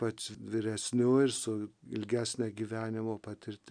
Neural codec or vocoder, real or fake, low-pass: none; real; 9.9 kHz